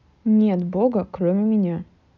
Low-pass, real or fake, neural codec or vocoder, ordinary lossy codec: 7.2 kHz; real; none; none